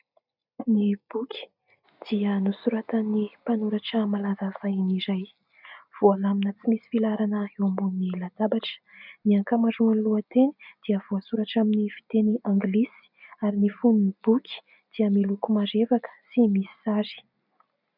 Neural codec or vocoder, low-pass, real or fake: none; 5.4 kHz; real